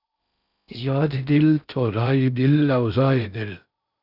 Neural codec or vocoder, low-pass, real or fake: codec, 16 kHz in and 24 kHz out, 0.6 kbps, FocalCodec, streaming, 4096 codes; 5.4 kHz; fake